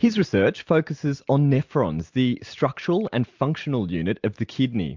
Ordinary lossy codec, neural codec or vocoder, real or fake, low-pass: MP3, 64 kbps; none; real; 7.2 kHz